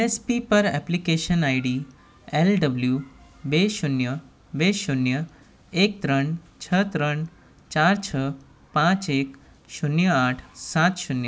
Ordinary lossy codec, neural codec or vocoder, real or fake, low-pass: none; none; real; none